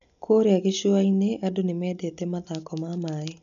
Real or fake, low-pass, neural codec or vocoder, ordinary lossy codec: real; 7.2 kHz; none; none